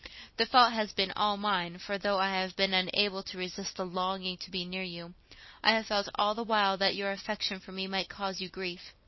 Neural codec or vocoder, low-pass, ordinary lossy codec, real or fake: none; 7.2 kHz; MP3, 24 kbps; real